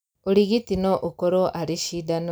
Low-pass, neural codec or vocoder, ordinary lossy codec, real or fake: none; none; none; real